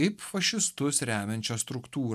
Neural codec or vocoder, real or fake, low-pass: none; real; 14.4 kHz